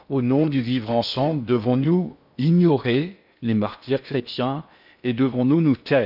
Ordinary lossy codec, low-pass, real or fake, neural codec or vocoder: none; 5.4 kHz; fake; codec, 16 kHz in and 24 kHz out, 0.6 kbps, FocalCodec, streaming, 2048 codes